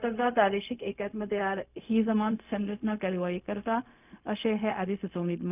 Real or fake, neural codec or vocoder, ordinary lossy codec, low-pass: fake; codec, 16 kHz, 0.4 kbps, LongCat-Audio-Codec; none; 3.6 kHz